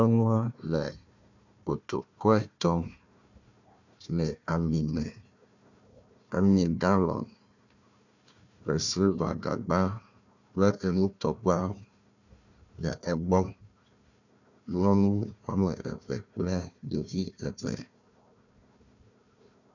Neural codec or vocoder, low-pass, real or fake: codec, 16 kHz, 1 kbps, FunCodec, trained on Chinese and English, 50 frames a second; 7.2 kHz; fake